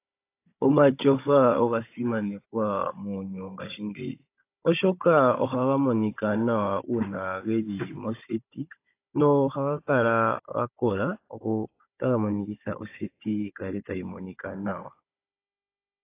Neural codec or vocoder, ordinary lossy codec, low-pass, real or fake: codec, 16 kHz, 16 kbps, FunCodec, trained on Chinese and English, 50 frames a second; AAC, 24 kbps; 3.6 kHz; fake